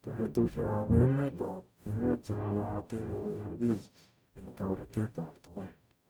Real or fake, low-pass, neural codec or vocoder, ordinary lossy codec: fake; none; codec, 44.1 kHz, 0.9 kbps, DAC; none